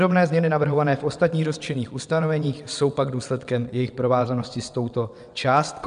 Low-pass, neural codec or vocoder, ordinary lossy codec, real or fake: 9.9 kHz; vocoder, 22.05 kHz, 80 mel bands, WaveNeXt; MP3, 96 kbps; fake